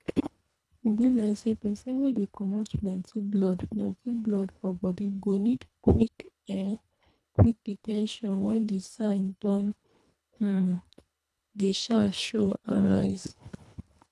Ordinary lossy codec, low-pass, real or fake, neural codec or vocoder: none; none; fake; codec, 24 kHz, 1.5 kbps, HILCodec